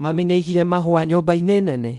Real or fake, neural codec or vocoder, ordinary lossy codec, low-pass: fake; codec, 16 kHz in and 24 kHz out, 0.8 kbps, FocalCodec, streaming, 65536 codes; none; 10.8 kHz